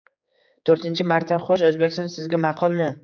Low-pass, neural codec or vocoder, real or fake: 7.2 kHz; codec, 16 kHz, 4 kbps, X-Codec, HuBERT features, trained on general audio; fake